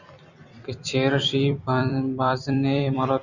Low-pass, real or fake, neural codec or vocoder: 7.2 kHz; fake; vocoder, 44.1 kHz, 128 mel bands every 256 samples, BigVGAN v2